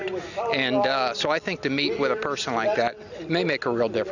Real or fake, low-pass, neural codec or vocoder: real; 7.2 kHz; none